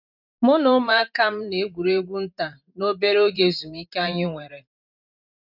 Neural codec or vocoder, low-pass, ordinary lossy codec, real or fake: vocoder, 44.1 kHz, 128 mel bands every 512 samples, BigVGAN v2; 5.4 kHz; none; fake